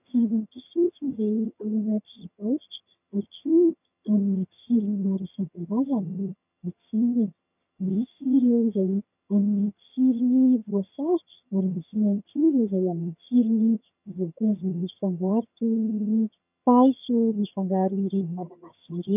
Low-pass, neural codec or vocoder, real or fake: 3.6 kHz; vocoder, 22.05 kHz, 80 mel bands, HiFi-GAN; fake